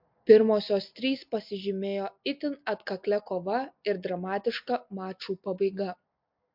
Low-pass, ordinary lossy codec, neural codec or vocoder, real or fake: 5.4 kHz; MP3, 48 kbps; none; real